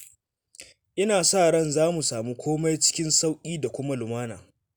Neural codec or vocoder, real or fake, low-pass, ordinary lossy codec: none; real; none; none